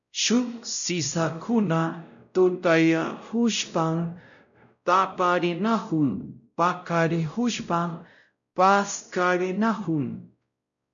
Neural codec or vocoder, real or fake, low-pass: codec, 16 kHz, 0.5 kbps, X-Codec, WavLM features, trained on Multilingual LibriSpeech; fake; 7.2 kHz